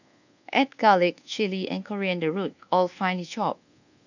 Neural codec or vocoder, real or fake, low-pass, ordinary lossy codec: codec, 24 kHz, 1.2 kbps, DualCodec; fake; 7.2 kHz; none